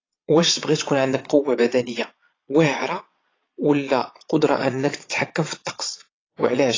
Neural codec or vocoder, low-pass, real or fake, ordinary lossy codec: vocoder, 22.05 kHz, 80 mel bands, Vocos; 7.2 kHz; fake; AAC, 32 kbps